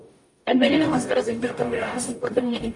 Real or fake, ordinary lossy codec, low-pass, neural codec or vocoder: fake; MP3, 48 kbps; 10.8 kHz; codec, 44.1 kHz, 0.9 kbps, DAC